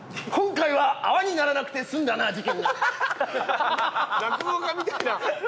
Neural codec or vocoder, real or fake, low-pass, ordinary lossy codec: none; real; none; none